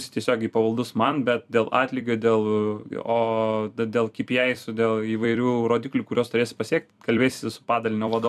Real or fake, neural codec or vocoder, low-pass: fake; vocoder, 44.1 kHz, 128 mel bands every 256 samples, BigVGAN v2; 14.4 kHz